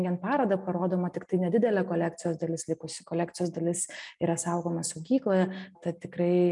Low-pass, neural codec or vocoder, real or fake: 10.8 kHz; none; real